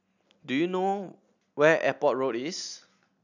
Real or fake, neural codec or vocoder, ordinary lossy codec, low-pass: real; none; none; 7.2 kHz